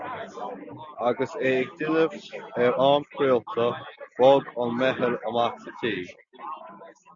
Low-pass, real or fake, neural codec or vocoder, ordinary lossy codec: 7.2 kHz; real; none; Opus, 64 kbps